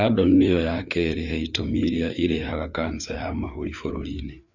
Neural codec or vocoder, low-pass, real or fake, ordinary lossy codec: codec, 16 kHz, 4 kbps, FreqCodec, larger model; 7.2 kHz; fake; none